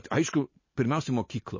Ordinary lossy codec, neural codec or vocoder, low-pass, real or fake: MP3, 32 kbps; none; 7.2 kHz; real